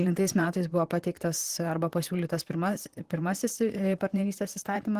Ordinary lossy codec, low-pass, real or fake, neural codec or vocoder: Opus, 16 kbps; 14.4 kHz; fake; vocoder, 44.1 kHz, 128 mel bands, Pupu-Vocoder